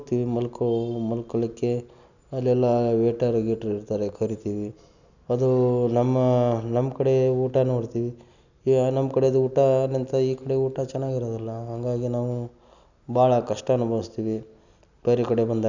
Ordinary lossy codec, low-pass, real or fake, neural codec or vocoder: none; 7.2 kHz; real; none